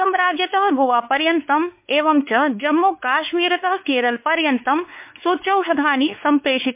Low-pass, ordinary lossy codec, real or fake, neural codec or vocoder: 3.6 kHz; MP3, 32 kbps; fake; codec, 16 kHz, 4 kbps, X-Codec, WavLM features, trained on Multilingual LibriSpeech